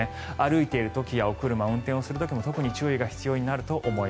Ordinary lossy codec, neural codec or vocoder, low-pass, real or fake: none; none; none; real